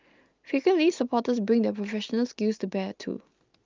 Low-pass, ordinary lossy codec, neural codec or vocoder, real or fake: 7.2 kHz; Opus, 24 kbps; none; real